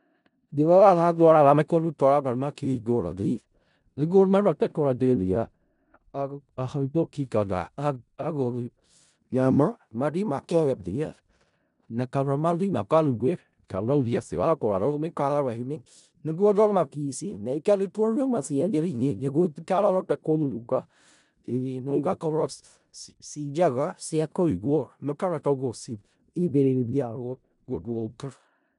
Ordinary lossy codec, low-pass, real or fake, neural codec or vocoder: none; 10.8 kHz; fake; codec, 16 kHz in and 24 kHz out, 0.4 kbps, LongCat-Audio-Codec, four codebook decoder